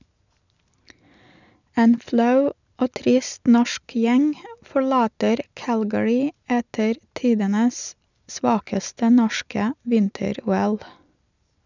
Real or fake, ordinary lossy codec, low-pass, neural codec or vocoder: real; none; 7.2 kHz; none